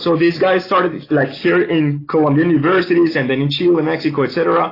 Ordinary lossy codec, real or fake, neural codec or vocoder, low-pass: AAC, 24 kbps; real; none; 5.4 kHz